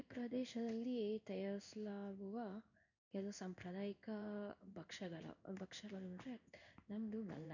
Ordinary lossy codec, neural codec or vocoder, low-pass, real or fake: none; codec, 16 kHz in and 24 kHz out, 1 kbps, XY-Tokenizer; 7.2 kHz; fake